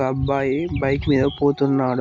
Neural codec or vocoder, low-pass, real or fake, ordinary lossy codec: none; 7.2 kHz; real; MP3, 48 kbps